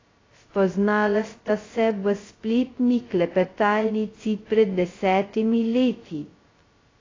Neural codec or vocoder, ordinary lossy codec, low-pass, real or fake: codec, 16 kHz, 0.2 kbps, FocalCodec; AAC, 32 kbps; 7.2 kHz; fake